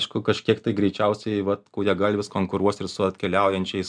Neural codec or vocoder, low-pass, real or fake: none; 9.9 kHz; real